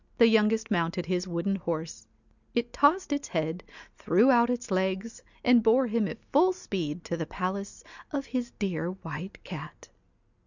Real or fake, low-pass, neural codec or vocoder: real; 7.2 kHz; none